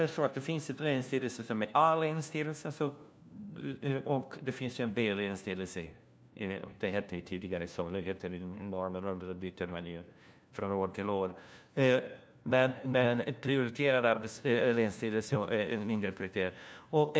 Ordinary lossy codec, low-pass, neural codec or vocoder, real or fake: none; none; codec, 16 kHz, 1 kbps, FunCodec, trained on LibriTTS, 50 frames a second; fake